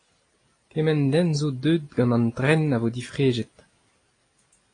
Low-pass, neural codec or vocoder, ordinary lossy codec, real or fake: 9.9 kHz; none; AAC, 32 kbps; real